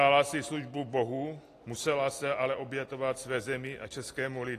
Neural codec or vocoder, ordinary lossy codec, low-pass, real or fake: none; AAC, 48 kbps; 14.4 kHz; real